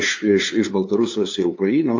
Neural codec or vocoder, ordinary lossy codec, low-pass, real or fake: codec, 16 kHz, 2 kbps, FunCodec, trained on LibriTTS, 25 frames a second; AAC, 48 kbps; 7.2 kHz; fake